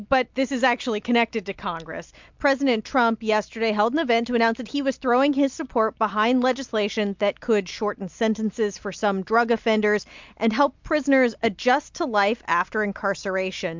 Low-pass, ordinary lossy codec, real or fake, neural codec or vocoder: 7.2 kHz; MP3, 64 kbps; real; none